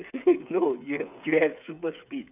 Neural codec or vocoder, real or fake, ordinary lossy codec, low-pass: codec, 16 kHz, 8 kbps, FreqCodec, smaller model; fake; none; 3.6 kHz